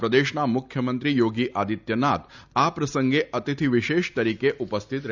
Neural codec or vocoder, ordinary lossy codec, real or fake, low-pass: none; none; real; 7.2 kHz